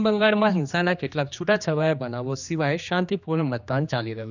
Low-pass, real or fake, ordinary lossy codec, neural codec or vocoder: 7.2 kHz; fake; none; codec, 16 kHz, 2 kbps, X-Codec, HuBERT features, trained on general audio